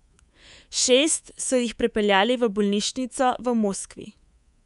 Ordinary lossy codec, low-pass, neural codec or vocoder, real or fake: none; 10.8 kHz; codec, 24 kHz, 3.1 kbps, DualCodec; fake